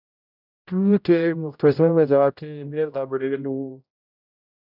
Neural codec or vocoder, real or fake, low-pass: codec, 16 kHz, 0.5 kbps, X-Codec, HuBERT features, trained on general audio; fake; 5.4 kHz